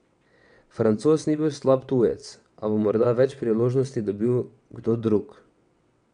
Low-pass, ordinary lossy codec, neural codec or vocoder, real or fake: 9.9 kHz; none; vocoder, 22.05 kHz, 80 mel bands, WaveNeXt; fake